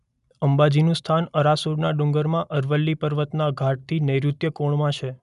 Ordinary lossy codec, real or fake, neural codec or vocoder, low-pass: none; real; none; 10.8 kHz